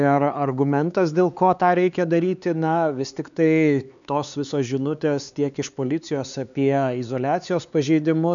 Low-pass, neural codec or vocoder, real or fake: 7.2 kHz; codec, 16 kHz, 4 kbps, X-Codec, HuBERT features, trained on LibriSpeech; fake